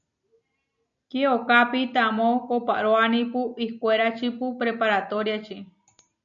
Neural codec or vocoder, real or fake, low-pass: none; real; 7.2 kHz